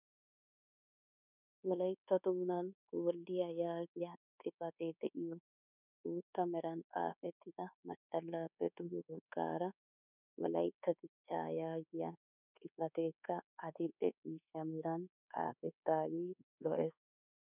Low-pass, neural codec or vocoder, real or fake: 3.6 kHz; codec, 24 kHz, 1.2 kbps, DualCodec; fake